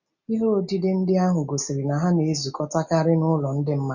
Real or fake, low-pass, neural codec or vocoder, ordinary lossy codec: real; 7.2 kHz; none; none